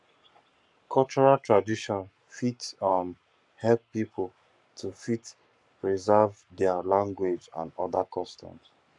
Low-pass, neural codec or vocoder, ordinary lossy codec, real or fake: 10.8 kHz; codec, 44.1 kHz, 7.8 kbps, Pupu-Codec; none; fake